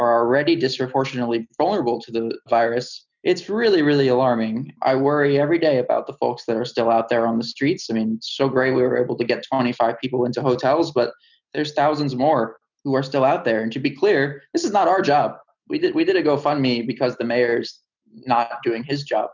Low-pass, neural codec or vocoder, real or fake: 7.2 kHz; none; real